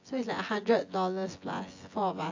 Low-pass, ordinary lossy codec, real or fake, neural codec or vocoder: 7.2 kHz; AAC, 48 kbps; fake; vocoder, 24 kHz, 100 mel bands, Vocos